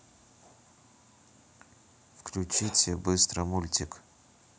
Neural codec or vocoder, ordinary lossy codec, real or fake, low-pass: none; none; real; none